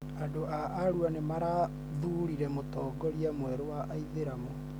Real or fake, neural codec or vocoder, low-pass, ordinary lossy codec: real; none; none; none